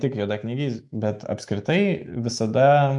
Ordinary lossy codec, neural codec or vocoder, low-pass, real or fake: MP3, 64 kbps; autoencoder, 48 kHz, 128 numbers a frame, DAC-VAE, trained on Japanese speech; 10.8 kHz; fake